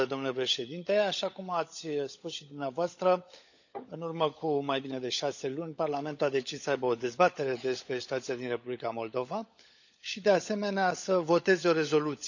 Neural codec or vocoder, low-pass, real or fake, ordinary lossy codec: codec, 16 kHz, 16 kbps, FunCodec, trained on LibriTTS, 50 frames a second; 7.2 kHz; fake; none